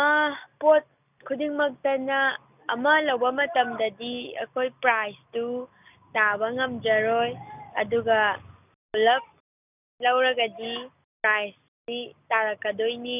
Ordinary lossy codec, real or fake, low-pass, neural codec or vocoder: none; real; 3.6 kHz; none